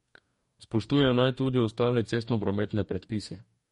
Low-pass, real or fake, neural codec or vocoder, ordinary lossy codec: 19.8 kHz; fake; codec, 44.1 kHz, 2.6 kbps, DAC; MP3, 48 kbps